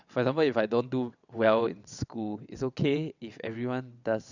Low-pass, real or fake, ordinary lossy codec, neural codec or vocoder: 7.2 kHz; fake; none; vocoder, 22.05 kHz, 80 mel bands, WaveNeXt